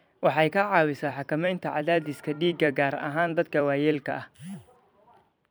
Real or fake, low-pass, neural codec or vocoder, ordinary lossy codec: fake; none; vocoder, 44.1 kHz, 128 mel bands every 256 samples, BigVGAN v2; none